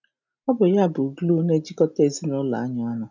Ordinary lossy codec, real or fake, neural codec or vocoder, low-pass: none; real; none; 7.2 kHz